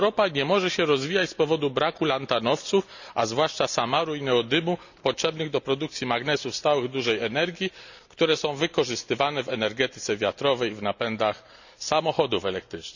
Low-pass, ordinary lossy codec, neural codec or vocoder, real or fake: 7.2 kHz; none; none; real